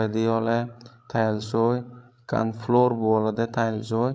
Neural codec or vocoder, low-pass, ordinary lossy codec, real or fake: codec, 16 kHz, 16 kbps, FreqCodec, larger model; none; none; fake